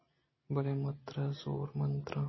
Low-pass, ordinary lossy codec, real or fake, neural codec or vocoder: 7.2 kHz; MP3, 24 kbps; real; none